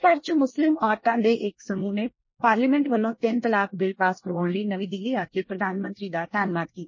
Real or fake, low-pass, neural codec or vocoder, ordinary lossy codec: fake; 7.2 kHz; codec, 24 kHz, 1 kbps, SNAC; MP3, 32 kbps